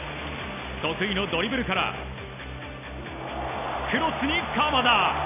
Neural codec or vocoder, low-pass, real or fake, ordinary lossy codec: none; 3.6 kHz; real; MP3, 24 kbps